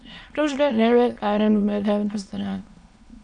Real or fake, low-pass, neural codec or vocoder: fake; 9.9 kHz; autoencoder, 22.05 kHz, a latent of 192 numbers a frame, VITS, trained on many speakers